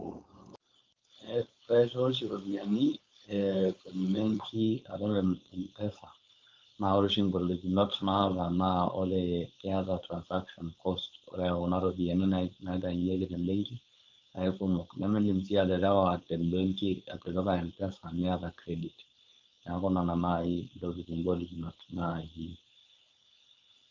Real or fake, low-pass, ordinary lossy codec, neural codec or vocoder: fake; 7.2 kHz; Opus, 24 kbps; codec, 16 kHz, 4.8 kbps, FACodec